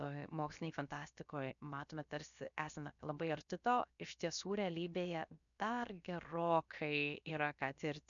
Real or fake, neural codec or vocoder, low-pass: fake; codec, 16 kHz, 0.7 kbps, FocalCodec; 7.2 kHz